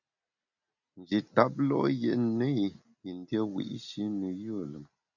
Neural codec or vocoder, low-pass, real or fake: none; 7.2 kHz; real